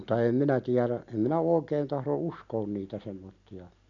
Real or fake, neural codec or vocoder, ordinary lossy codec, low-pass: real; none; none; 7.2 kHz